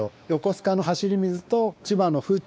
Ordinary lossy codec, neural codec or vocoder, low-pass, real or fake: none; codec, 16 kHz, 2 kbps, X-Codec, WavLM features, trained on Multilingual LibriSpeech; none; fake